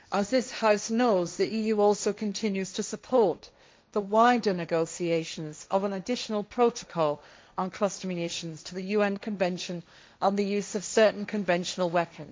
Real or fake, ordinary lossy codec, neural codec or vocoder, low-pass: fake; none; codec, 16 kHz, 1.1 kbps, Voila-Tokenizer; none